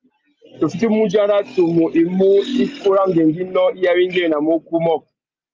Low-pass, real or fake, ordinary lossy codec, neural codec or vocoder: 7.2 kHz; real; Opus, 32 kbps; none